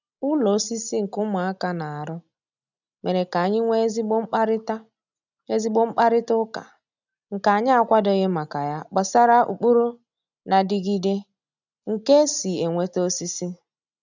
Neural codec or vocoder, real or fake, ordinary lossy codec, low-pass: none; real; none; 7.2 kHz